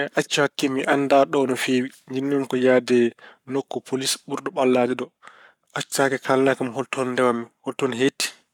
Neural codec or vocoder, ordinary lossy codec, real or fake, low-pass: codec, 44.1 kHz, 7.8 kbps, Pupu-Codec; none; fake; 19.8 kHz